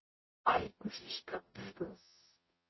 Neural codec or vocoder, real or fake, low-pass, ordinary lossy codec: codec, 44.1 kHz, 0.9 kbps, DAC; fake; 7.2 kHz; MP3, 24 kbps